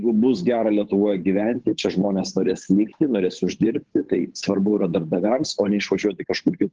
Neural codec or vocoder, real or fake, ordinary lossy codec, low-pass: codec, 16 kHz, 8 kbps, FunCodec, trained on Chinese and English, 25 frames a second; fake; Opus, 16 kbps; 7.2 kHz